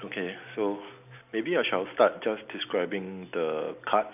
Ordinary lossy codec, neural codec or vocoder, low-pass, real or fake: none; none; 3.6 kHz; real